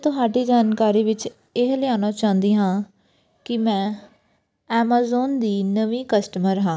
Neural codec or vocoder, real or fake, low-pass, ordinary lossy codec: none; real; none; none